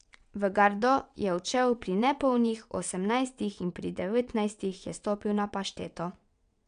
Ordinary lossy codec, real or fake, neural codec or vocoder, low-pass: none; fake; vocoder, 22.05 kHz, 80 mel bands, WaveNeXt; 9.9 kHz